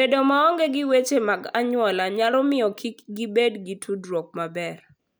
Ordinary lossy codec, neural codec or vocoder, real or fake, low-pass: none; none; real; none